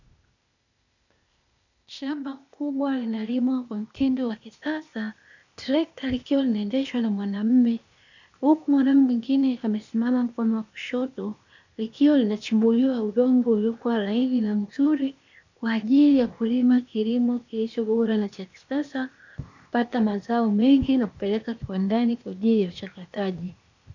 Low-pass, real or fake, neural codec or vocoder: 7.2 kHz; fake; codec, 16 kHz, 0.8 kbps, ZipCodec